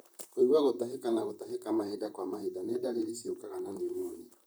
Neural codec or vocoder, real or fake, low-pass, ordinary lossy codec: vocoder, 44.1 kHz, 128 mel bands, Pupu-Vocoder; fake; none; none